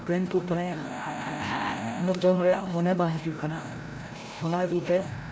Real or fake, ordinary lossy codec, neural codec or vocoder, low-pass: fake; none; codec, 16 kHz, 0.5 kbps, FreqCodec, larger model; none